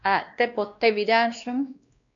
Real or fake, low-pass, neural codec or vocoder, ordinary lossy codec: fake; 7.2 kHz; codec, 16 kHz, 1 kbps, X-Codec, WavLM features, trained on Multilingual LibriSpeech; MP3, 64 kbps